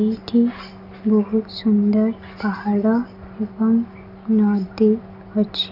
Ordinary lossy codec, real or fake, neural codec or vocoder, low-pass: none; real; none; 5.4 kHz